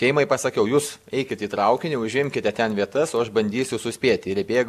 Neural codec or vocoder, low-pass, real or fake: none; 14.4 kHz; real